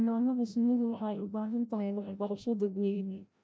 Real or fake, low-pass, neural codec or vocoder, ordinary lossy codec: fake; none; codec, 16 kHz, 0.5 kbps, FreqCodec, larger model; none